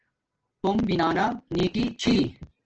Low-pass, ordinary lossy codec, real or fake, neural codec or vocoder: 7.2 kHz; Opus, 16 kbps; real; none